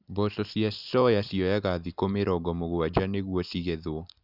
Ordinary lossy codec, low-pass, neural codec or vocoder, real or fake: none; 5.4 kHz; none; real